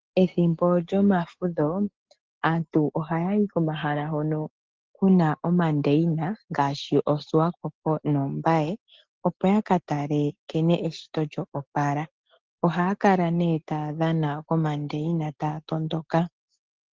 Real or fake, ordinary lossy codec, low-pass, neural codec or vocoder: real; Opus, 16 kbps; 7.2 kHz; none